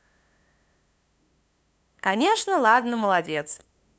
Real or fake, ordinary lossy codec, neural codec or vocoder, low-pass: fake; none; codec, 16 kHz, 2 kbps, FunCodec, trained on LibriTTS, 25 frames a second; none